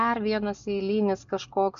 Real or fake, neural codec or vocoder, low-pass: real; none; 7.2 kHz